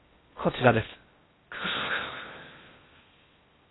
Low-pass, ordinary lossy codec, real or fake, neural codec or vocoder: 7.2 kHz; AAC, 16 kbps; fake; codec, 16 kHz in and 24 kHz out, 0.6 kbps, FocalCodec, streaming, 4096 codes